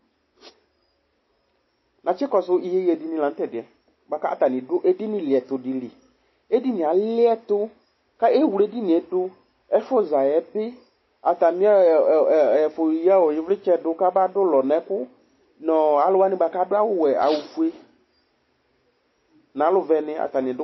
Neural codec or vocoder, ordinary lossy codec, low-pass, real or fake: none; MP3, 24 kbps; 7.2 kHz; real